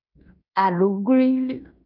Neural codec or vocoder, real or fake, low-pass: codec, 16 kHz in and 24 kHz out, 0.9 kbps, LongCat-Audio-Codec, fine tuned four codebook decoder; fake; 5.4 kHz